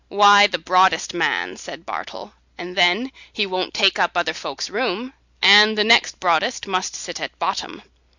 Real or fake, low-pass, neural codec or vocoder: real; 7.2 kHz; none